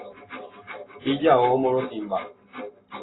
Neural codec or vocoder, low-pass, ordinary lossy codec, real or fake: none; 7.2 kHz; AAC, 16 kbps; real